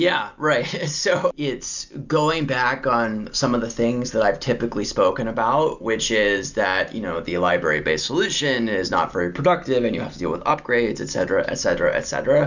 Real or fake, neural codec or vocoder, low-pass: real; none; 7.2 kHz